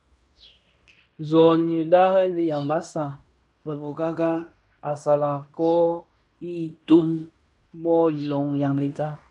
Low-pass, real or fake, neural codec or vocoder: 10.8 kHz; fake; codec, 16 kHz in and 24 kHz out, 0.9 kbps, LongCat-Audio-Codec, fine tuned four codebook decoder